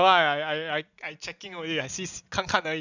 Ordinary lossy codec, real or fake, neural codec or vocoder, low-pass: none; real; none; 7.2 kHz